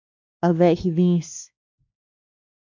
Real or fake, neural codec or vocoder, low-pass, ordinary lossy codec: fake; codec, 16 kHz, 2 kbps, X-Codec, WavLM features, trained on Multilingual LibriSpeech; 7.2 kHz; MP3, 64 kbps